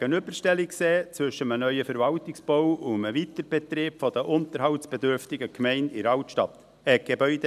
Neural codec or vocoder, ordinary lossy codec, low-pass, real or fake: none; none; 14.4 kHz; real